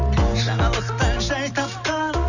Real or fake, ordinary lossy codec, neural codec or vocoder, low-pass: real; none; none; 7.2 kHz